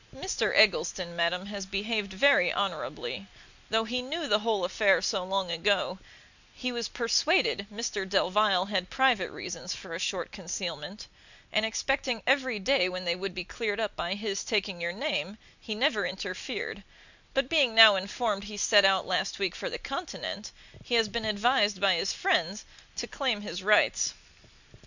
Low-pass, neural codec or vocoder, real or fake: 7.2 kHz; none; real